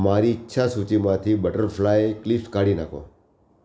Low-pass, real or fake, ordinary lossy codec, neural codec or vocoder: none; real; none; none